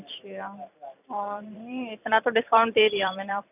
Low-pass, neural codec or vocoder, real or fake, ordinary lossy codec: 3.6 kHz; vocoder, 44.1 kHz, 128 mel bands every 256 samples, BigVGAN v2; fake; none